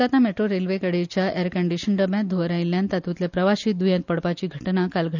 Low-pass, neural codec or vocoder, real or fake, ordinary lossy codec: 7.2 kHz; none; real; none